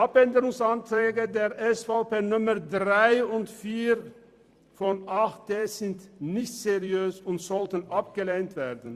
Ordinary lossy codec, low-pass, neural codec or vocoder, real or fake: Opus, 64 kbps; 14.4 kHz; vocoder, 44.1 kHz, 128 mel bands every 256 samples, BigVGAN v2; fake